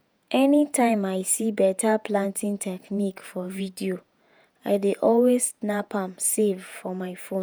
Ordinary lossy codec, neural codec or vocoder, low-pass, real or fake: none; vocoder, 48 kHz, 128 mel bands, Vocos; none; fake